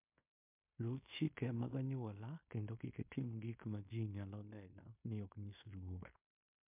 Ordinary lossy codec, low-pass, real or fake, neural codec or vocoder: MP3, 32 kbps; 3.6 kHz; fake; codec, 16 kHz in and 24 kHz out, 0.9 kbps, LongCat-Audio-Codec, fine tuned four codebook decoder